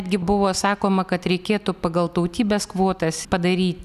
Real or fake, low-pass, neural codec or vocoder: real; 14.4 kHz; none